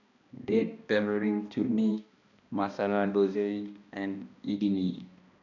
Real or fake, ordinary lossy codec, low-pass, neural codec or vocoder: fake; none; 7.2 kHz; codec, 16 kHz, 1 kbps, X-Codec, HuBERT features, trained on balanced general audio